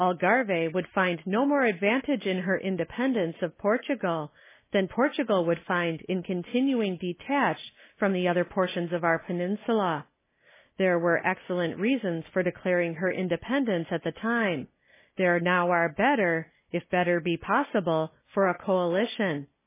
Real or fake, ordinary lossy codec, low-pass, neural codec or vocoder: real; MP3, 16 kbps; 3.6 kHz; none